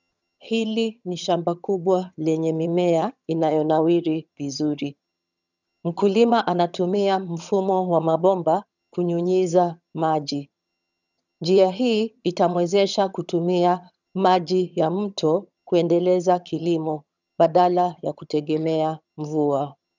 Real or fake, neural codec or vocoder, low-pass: fake; vocoder, 22.05 kHz, 80 mel bands, HiFi-GAN; 7.2 kHz